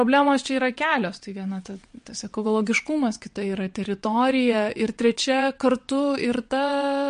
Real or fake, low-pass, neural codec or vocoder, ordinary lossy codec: fake; 9.9 kHz; vocoder, 22.05 kHz, 80 mel bands, WaveNeXt; MP3, 48 kbps